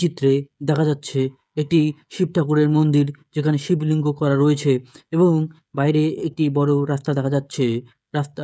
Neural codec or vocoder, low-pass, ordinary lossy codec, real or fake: codec, 16 kHz, 16 kbps, FreqCodec, smaller model; none; none; fake